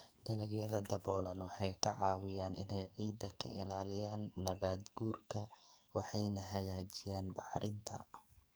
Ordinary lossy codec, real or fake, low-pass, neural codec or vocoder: none; fake; none; codec, 44.1 kHz, 2.6 kbps, SNAC